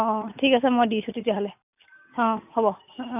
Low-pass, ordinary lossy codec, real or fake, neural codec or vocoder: 3.6 kHz; none; real; none